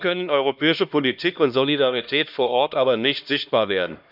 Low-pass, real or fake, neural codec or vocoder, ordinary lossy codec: 5.4 kHz; fake; codec, 16 kHz, 1 kbps, X-Codec, HuBERT features, trained on LibriSpeech; none